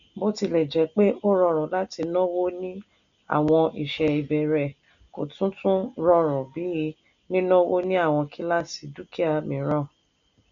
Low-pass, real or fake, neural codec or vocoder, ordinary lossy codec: 7.2 kHz; real; none; none